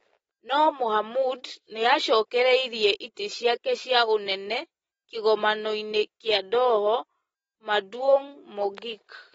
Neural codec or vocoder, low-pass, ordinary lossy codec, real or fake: none; 19.8 kHz; AAC, 24 kbps; real